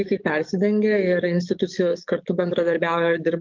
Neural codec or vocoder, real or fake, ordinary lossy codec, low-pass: codec, 44.1 kHz, 7.8 kbps, DAC; fake; Opus, 24 kbps; 7.2 kHz